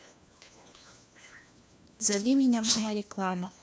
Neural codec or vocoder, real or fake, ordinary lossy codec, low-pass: codec, 16 kHz, 1 kbps, FunCodec, trained on LibriTTS, 50 frames a second; fake; none; none